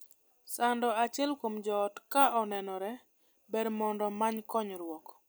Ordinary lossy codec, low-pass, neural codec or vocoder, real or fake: none; none; none; real